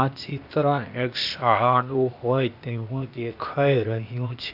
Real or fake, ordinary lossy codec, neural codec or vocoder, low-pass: fake; none; codec, 16 kHz, 0.8 kbps, ZipCodec; 5.4 kHz